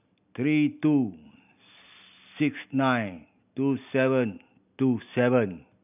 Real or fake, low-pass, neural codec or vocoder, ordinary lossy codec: real; 3.6 kHz; none; none